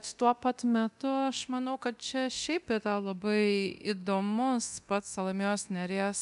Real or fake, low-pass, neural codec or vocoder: fake; 10.8 kHz; codec, 24 kHz, 0.9 kbps, DualCodec